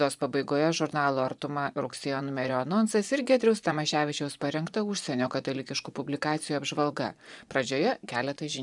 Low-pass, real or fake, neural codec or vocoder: 10.8 kHz; fake; vocoder, 24 kHz, 100 mel bands, Vocos